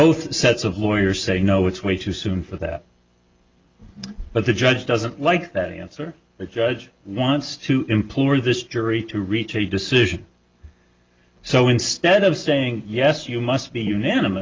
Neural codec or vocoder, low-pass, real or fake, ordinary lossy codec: none; 7.2 kHz; real; Opus, 32 kbps